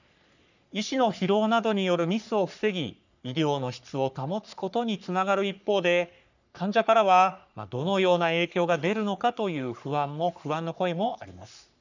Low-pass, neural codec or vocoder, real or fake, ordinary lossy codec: 7.2 kHz; codec, 44.1 kHz, 3.4 kbps, Pupu-Codec; fake; none